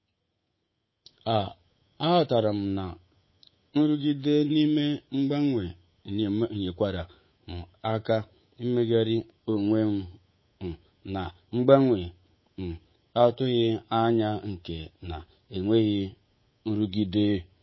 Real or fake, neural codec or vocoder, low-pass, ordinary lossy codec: fake; codec, 24 kHz, 3.1 kbps, DualCodec; 7.2 kHz; MP3, 24 kbps